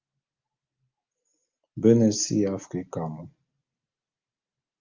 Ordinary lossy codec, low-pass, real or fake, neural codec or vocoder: Opus, 24 kbps; 7.2 kHz; real; none